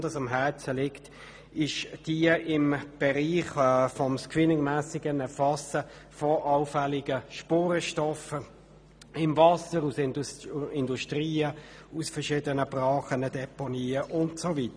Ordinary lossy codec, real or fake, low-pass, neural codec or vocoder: none; real; 9.9 kHz; none